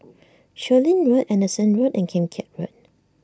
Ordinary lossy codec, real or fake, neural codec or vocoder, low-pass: none; real; none; none